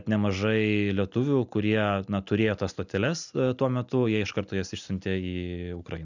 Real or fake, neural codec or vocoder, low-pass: real; none; 7.2 kHz